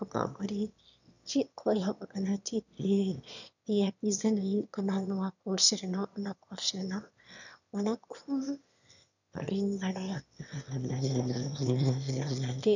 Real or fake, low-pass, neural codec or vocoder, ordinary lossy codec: fake; 7.2 kHz; autoencoder, 22.05 kHz, a latent of 192 numbers a frame, VITS, trained on one speaker; none